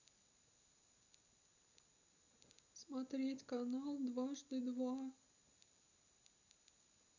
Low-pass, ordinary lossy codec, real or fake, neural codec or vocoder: 7.2 kHz; none; real; none